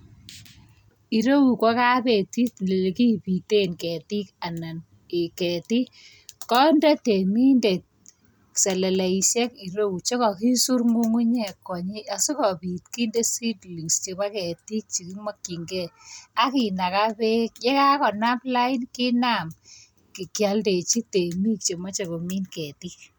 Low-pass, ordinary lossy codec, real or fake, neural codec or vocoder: none; none; real; none